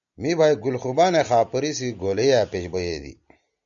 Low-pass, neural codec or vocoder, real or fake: 7.2 kHz; none; real